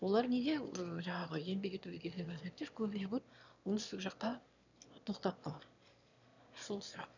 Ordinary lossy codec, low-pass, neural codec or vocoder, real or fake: none; 7.2 kHz; autoencoder, 22.05 kHz, a latent of 192 numbers a frame, VITS, trained on one speaker; fake